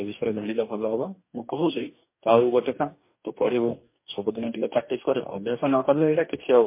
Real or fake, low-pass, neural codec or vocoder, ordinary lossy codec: fake; 3.6 kHz; codec, 44.1 kHz, 2.6 kbps, DAC; MP3, 24 kbps